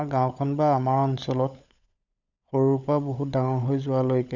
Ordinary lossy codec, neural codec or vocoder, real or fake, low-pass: none; codec, 16 kHz, 16 kbps, FunCodec, trained on LibriTTS, 50 frames a second; fake; 7.2 kHz